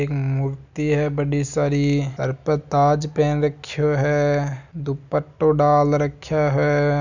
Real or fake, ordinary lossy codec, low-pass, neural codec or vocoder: real; none; 7.2 kHz; none